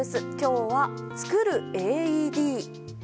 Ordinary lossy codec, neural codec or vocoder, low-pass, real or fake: none; none; none; real